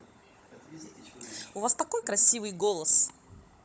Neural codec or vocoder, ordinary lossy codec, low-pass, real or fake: codec, 16 kHz, 16 kbps, FunCodec, trained on Chinese and English, 50 frames a second; none; none; fake